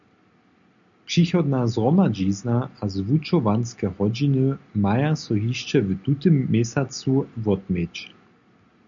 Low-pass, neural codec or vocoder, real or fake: 7.2 kHz; none; real